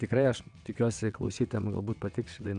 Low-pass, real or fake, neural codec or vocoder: 9.9 kHz; fake; vocoder, 22.05 kHz, 80 mel bands, WaveNeXt